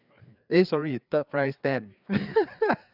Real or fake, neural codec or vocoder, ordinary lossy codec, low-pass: fake; codec, 16 kHz, 4 kbps, FreqCodec, larger model; none; 5.4 kHz